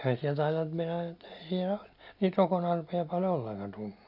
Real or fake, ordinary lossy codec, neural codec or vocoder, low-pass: real; none; none; 5.4 kHz